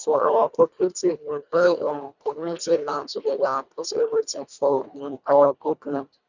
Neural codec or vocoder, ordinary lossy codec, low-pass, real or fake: codec, 24 kHz, 1.5 kbps, HILCodec; none; 7.2 kHz; fake